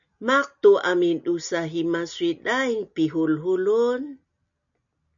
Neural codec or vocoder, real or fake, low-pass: none; real; 7.2 kHz